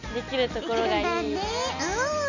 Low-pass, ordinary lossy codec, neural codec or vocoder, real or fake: 7.2 kHz; none; none; real